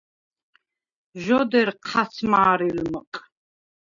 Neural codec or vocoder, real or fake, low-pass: none; real; 7.2 kHz